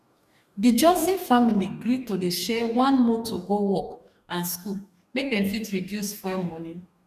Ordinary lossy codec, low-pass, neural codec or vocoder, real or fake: none; 14.4 kHz; codec, 44.1 kHz, 2.6 kbps, DAC; fake